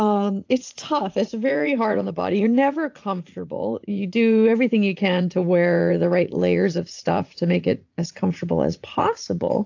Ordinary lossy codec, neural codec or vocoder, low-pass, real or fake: AAC, 48 kbps; vocoder, 44.1 kHz, 80 mel bands, Vocos; 7.2 kHz; fake